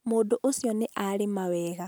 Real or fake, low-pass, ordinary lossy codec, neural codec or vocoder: real; none; none; none